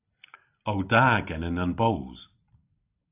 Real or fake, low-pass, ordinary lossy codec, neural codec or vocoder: real; 3.6 kHz; AAC, 32 kbps; none